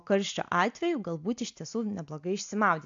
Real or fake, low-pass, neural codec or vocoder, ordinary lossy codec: real; 7.2 kHz; none; AAC, 64 kbps